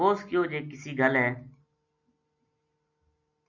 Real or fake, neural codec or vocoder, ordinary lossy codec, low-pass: real; none; MP3, 48 kbps; 7.2 kHz